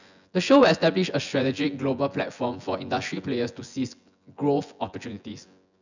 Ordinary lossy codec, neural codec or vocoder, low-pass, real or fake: none; vocoder, 24 kHz, 100 mel bands, Vocos; 7.2 kHz; fake